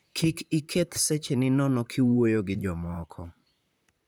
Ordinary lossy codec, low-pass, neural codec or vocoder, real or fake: none; none; vocoder, 44.1 kHz, 128 mel bands, Pupu-Vocoder; fake